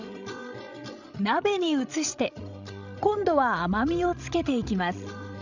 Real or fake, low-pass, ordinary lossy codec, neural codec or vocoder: fake; 7.2 kHz; none; codec, 16 kHz, 16 kbps, FreqCodec, larger model